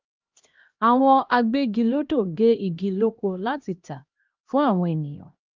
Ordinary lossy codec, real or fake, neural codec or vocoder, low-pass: Opus, 24 kbps; fake; codec, 16 kHz, 1 kbps, X-Codec, HuBERT features, trained on LibriSpeech; 7.2 kHz